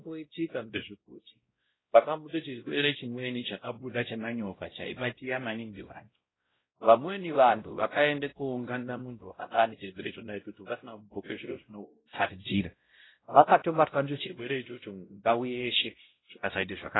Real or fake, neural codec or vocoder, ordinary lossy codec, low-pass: fake; codec, 16 kHz, 0.5 kbps, X-Codec, WavLM features, trained on Multilingual LibriSpeech; AAC, 16 kbps; 7.2 kHz